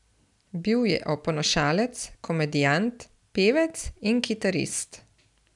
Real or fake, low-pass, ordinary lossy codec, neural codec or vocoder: real; 10.8 kHz; none; none